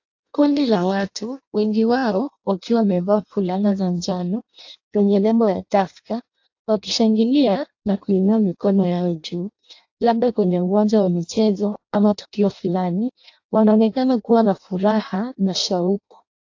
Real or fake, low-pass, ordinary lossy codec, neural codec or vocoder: fake; 7.2 kHz; AAC, 48 kbps; codec, 16 kHz in and 24 kHz out, 0.6 kbps, FireRedTTS-2 codec